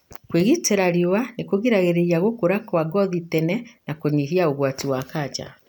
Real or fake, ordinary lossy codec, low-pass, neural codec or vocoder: real; none; none; none